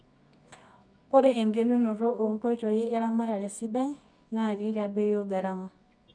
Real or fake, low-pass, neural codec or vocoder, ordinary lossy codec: fake; 9.9 kHz; codec, 24 kHz, 0.9 kbps, WavTokenizer, medium music audio release; none